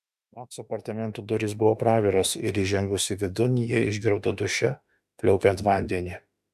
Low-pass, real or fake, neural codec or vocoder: 14.4 kHz; fake; autoencoder, 48 kHz, 32 numbers a frame, DAC-VAE, trained on Japanese speech